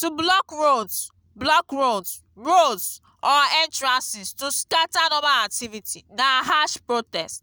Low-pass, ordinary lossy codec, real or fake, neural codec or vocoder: none; none; real; none